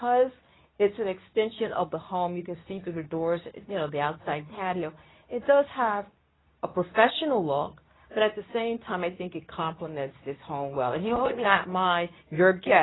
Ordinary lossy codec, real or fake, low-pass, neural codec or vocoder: AAC, 16 kbps; fake; 7.2 kHz; codec, 24 kHz, 0.9 kbps, WavTokenizer, medium speech release version 2